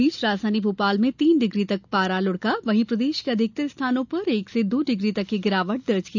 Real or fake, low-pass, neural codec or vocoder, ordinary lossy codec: real; 7.2 kHz; none; none